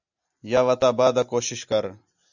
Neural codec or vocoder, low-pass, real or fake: none; 7.2 kHz; real